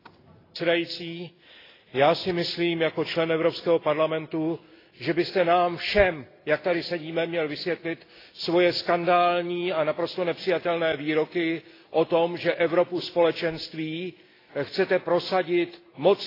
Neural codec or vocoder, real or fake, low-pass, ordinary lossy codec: none; real; 5.4 kHz; AAC, 24 kbps